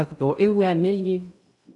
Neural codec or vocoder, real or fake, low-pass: codec, 16 kHz in and 24 kHz out, 0.6 kbps, FocalCodec, streaming, 2048 codes; fake; 10.8 kHz